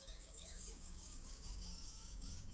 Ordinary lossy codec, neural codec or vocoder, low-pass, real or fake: none; codec, 16 kHz, 6 kbps, DAC; none; fake